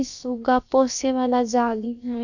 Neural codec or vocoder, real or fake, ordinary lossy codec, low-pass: codec, 16 kHz, about 1 kbps, DyCAST, with the encoder's durations; fake; none; 7.2 kHz